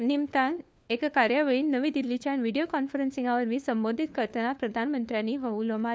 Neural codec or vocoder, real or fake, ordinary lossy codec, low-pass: codec, 16 kHz, 4 kbps, FunCodec, trained on LibriTTS, 50 frames a second; fake; none; none